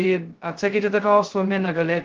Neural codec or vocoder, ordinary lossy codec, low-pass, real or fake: codec, 16 kHz, 0.2 kbps, FocalCodec; Opus, 16 kbps; 7.2 kHz; fake